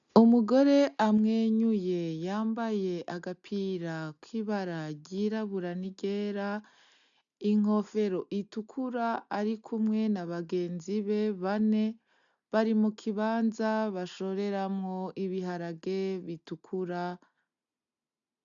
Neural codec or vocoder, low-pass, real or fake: none; 7.2 kHz; real